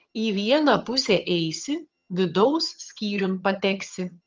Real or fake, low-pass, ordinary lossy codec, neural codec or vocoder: fake; 7.2 kHz; Opus, 24 kbps; vocoder, 22.05 kHz, 80 mel bands, HiFi-GAN